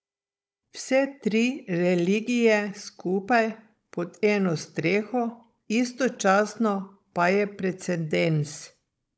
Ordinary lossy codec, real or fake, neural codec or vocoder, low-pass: none; fake; codec, 16 kHz, 16 kbps, FunCodec, trained on Chinese and English, 50 frames a second; none